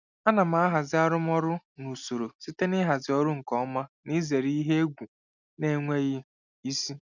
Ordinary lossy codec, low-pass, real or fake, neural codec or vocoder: none; 7.2 kHz; real; none